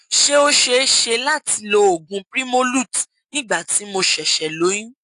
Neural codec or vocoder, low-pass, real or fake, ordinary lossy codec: none; 10.8 kHz; real; AAC, 64 kbps